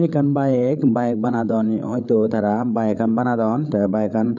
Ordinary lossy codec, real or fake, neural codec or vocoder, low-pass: none; fake; codec, 16 kHz, 8 kbps, FreqCodec, larger model; 7.2 kHz